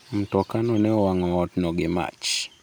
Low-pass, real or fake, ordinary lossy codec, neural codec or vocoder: none; real; none; none